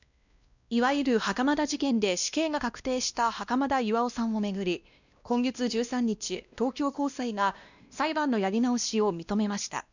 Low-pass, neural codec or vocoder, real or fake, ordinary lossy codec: 7.2 kHz; codec, 16 kHz, 1 kbps, X-Codec, WavLM features, trained on Multilingual LibriSpeech; fake; none